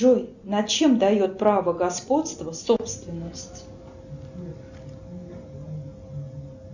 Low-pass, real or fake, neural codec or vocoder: 7.2 kHz; real; none